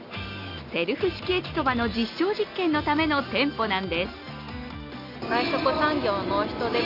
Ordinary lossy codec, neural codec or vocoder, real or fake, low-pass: none; none; real; 5.4 kHz